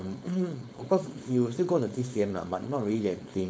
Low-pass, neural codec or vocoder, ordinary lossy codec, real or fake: none; codec, 16 kHz, 4.8 kbps, FACodec; none; fake